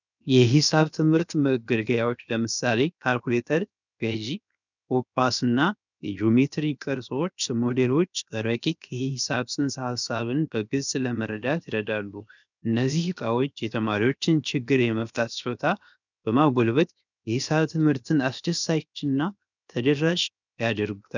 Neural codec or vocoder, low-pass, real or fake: codec, 16 kHz, 0.7 kbps, FocalCodec; 7.2 kHz; fake